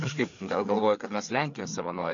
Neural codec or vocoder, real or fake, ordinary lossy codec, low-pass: codec, 16 kHz, 4 kbps, FreqCodec, larger model; fake; AAC, 64 kbps; 7.2 kHz